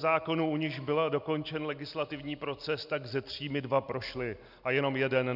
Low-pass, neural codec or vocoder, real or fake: 5.4 kHz; none; real